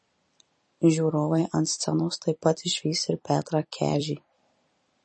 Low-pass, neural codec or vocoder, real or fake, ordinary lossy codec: 10.8 kHz; vocoder, 44.1 kHz, 128 mel bands every 256 samples, BigVGAN v2; fake; MP3, 32 kbps